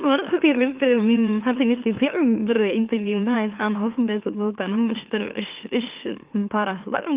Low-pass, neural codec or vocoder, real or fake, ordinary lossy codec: 3.6 kHz; autoencoder, 44.1 kHz, a latent of 192 numbers a frame, MeloTTS; fake; Opus, 32 kbps